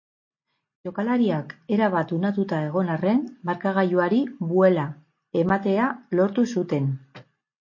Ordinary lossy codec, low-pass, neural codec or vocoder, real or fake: MP3, 32 kbps; 7.2 kHz; none; real